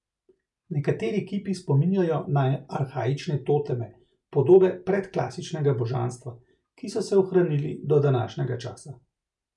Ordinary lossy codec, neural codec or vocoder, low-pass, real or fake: none; none; 10.8 kHz; real